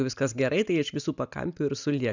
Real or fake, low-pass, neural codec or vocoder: real; 7.2 kHz; none